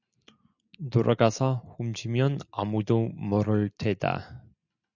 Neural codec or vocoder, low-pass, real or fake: none; 7.2 kHz; real